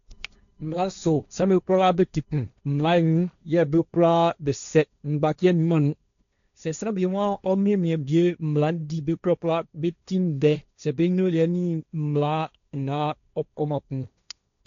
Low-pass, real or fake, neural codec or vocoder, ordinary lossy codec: 7.2 kHz; fake; codec, 16 kHz, 1.1 kbps, Voila-Tokenizer; none